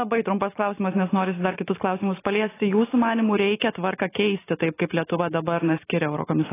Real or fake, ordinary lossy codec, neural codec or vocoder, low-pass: real; AAC, 16 kbps; none; 3.6 kHz